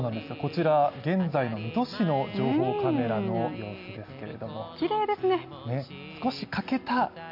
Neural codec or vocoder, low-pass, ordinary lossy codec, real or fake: none; 5.4 kHz; none; real